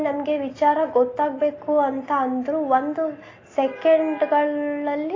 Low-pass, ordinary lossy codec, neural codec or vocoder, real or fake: 7.2 kHz; AAC, 32 kbps; none; real